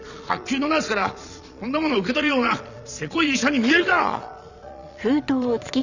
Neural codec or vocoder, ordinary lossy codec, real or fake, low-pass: vocoder, 22.05 kHz, 80 mel bands, Vocos; none; fake; 7.2 kHz